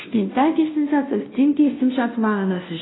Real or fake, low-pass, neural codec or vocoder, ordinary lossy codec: fake; 7.2 kHz; codec, 16 kHz, 0.5 kbps, FunCodec, trained on Chinese and English, 25 frames a second; AAC, 16 kbps